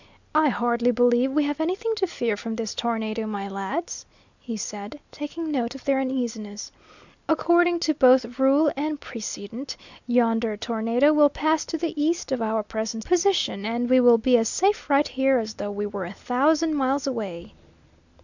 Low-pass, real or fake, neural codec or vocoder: 7.2 kHz; real; none